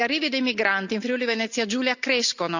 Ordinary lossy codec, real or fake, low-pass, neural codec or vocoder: none; real; 7.2 kHz; none